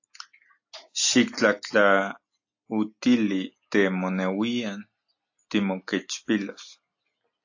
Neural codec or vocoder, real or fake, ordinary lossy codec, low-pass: none; real; AAC, 48 kbps; 7.2 kHz